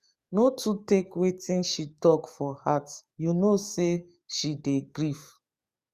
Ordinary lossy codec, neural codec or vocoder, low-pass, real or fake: Opus, 64 kbps; codec, 44.1 kHz, 7.8 kbps, DAC; 14.4 kHz; fake